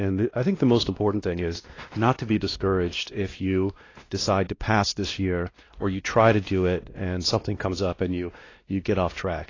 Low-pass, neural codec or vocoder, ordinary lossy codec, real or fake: 7.2 kHz; codec, 16 kHz, 1 kbps, X-Codec, WavLM features, trained on Multilingual LibriSpeech; AAC, 32 kbps; fake